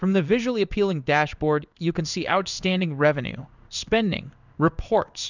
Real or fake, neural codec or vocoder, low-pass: fake; codec, 16 kHz in and 24 kHz out, 1 kbps, XY-Tokenizer; 7.2 kHz